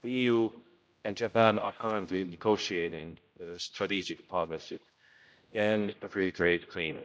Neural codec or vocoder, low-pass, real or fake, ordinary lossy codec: codec, 16 kHz, 0.5 kbps, X-Codec, HuBERT features, trained on general audio; none; fake; none